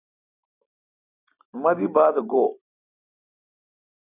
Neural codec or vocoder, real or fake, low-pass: none; real; 3.6 kHz